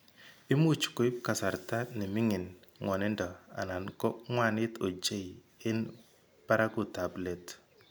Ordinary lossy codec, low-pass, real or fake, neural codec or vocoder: none; none; real; none